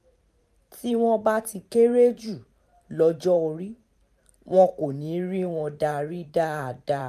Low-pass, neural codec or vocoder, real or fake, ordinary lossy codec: 14.4 kHz; vocoder, 44.1 kHz, 128 mel bands every 512 samples, BigVGAN v2; fake; none